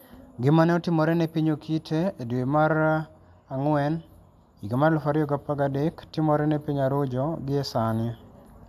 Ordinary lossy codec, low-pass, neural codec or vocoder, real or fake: AAC, 96 kbps; 14.4 kHz; none; real